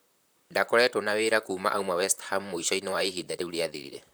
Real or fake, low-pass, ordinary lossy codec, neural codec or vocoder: fake; none; none; vocoder, 44.1 kHz, 128 mel bands, Pupu-Vocoder